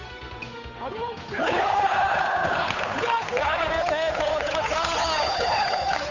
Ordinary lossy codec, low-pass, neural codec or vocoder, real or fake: none; 7.2 kHz; codec, 16 kHz, 8 kbps, FunCodec, trained on Chinese and English, 25 frames a second; fake